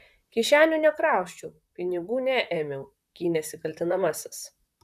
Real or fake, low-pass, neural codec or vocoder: fake; 14.4 kHz; vocoder, 44.1 kHz, 128 mel bands, Pupu-Vocoder